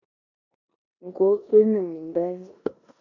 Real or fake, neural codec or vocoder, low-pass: fake; codec, 16 kHz in and 24 kHz out, 0.9 kbps, LongCat-Audio-Codec, four codebook decoder; 7.2 kHz